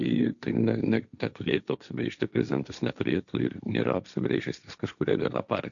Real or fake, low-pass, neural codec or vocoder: fake; 7.2 kHz; codec, 16 kHz, 1.1 kbps, Voila-Tokenizer